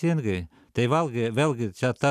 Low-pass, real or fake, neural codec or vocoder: 14.4 kHz; real; none